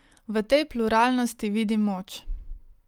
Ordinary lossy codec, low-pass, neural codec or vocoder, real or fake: Opus, 32 kbps; 19.8 kHz; none; real